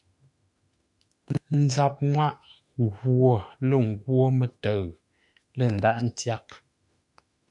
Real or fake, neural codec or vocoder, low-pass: fake; autoencoder, 48 kHz, 32 numbers a frame, DAC-VAE, trained on Japanese speech; 10.8 kHz